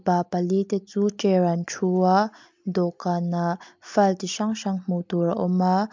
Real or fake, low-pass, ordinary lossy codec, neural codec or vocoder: real; 7.2 kHz; MP3, 64 kbps; none